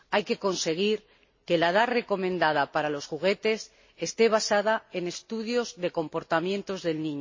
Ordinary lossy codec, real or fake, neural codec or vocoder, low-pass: MP3, 32 kbps; real; none; 7.2 kHz